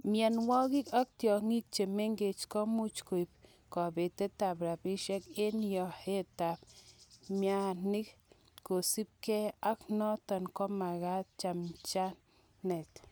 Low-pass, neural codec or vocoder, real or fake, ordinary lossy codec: none; none; real; none